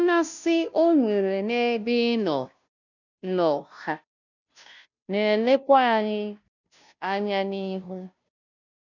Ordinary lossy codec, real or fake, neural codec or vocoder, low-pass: none; fake; codec, 16 kHz, 0.5 kbps, FunCodec, trained on Chinese and English, 25 frames a second; 7.2 kHz